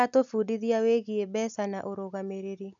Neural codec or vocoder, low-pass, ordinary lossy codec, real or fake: none; 7.2 kHz; MP3, 96 kbps; real